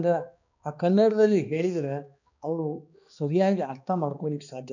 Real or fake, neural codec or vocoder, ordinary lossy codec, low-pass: fake; codec, 16 kHz, 2 kbps, X-Codec, HuBERT features, trained on balanced general audio; none; 7.2 kHz